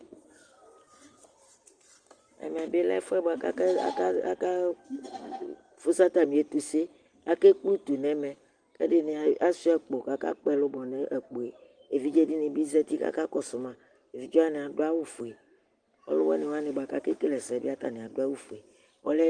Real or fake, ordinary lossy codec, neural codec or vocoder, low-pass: real; Opus, 24 kbps; none; 9.9 kHz